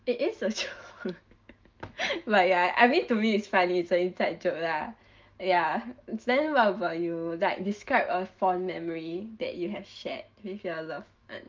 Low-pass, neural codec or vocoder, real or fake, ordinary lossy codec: 7.2 kHz; autoencoder, 48 kHz, 128 numbers a frame, DAC-VAE, trained on Japanese speech; fake; Opus, 24 kbps